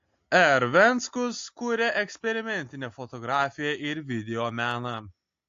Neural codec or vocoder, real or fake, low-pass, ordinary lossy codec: none; real; 7.2 kHz; AAC, 48 kbps